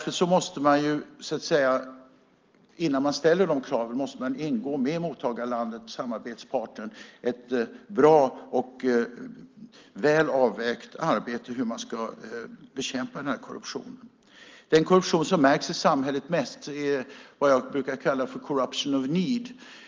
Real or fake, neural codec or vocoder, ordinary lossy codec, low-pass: real; none; Opus, 32 kbps; 7.2 kHz